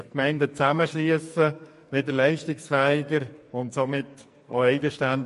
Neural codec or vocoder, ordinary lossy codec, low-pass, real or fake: codec, 44.1 kHz, 2.6 kbps, SNAC; MP3, 48 kbps; 14.4 kHz; fake